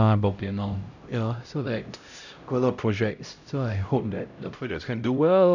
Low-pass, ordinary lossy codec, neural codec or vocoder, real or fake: 7.2 kHz; none; codec, 16 kHz, 0.5 kbps, X-Codec, HuBERT features, trained on LibriSpeech; fake